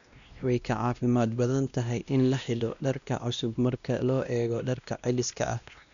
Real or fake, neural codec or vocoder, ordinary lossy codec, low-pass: fake; codec, 16 kHz, 1 kbps, X-Codec, WavLM features, trained on Multilingual LibriSpeech; none; 7.2 kHz